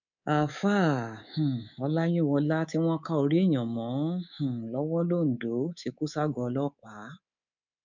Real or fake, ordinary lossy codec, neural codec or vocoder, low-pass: fake; none; codec, 24 kHz, 3.1 kbps, DualCodec; 7.2 kHz